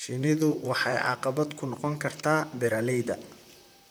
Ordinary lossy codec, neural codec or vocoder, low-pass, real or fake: none; vocoder, 44.1 kHz, 128 mel bands, Pupu-Vocoder; none; fake